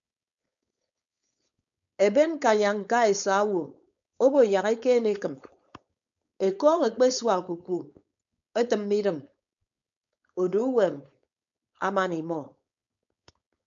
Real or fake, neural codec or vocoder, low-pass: fake; codec, 16 kHz, 4.8 kbps, FACodec; 7.2 kHz